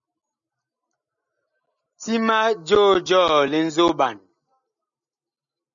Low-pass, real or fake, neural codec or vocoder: 7.2 kHz; real; none